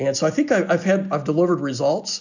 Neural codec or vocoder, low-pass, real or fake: none; 7.2 kHz; real